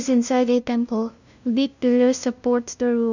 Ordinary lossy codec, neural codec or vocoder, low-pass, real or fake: none; codec, 16 kHz, 0.5 kbps, FunCodec, trained on LibriTTS, 25 frames a second; 7.2 kHz; fake